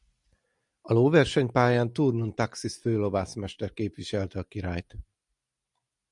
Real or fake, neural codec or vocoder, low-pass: real; none; 10.8 kHz